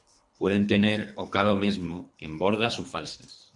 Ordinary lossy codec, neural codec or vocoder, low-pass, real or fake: MP3, 64 kbps; codec, 24 kHz, 3 kbps, HILCodec; 10.8 kHz; fake